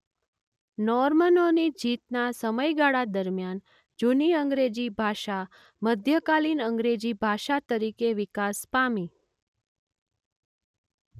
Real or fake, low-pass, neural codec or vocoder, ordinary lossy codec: real; 14.4 kHz; none; none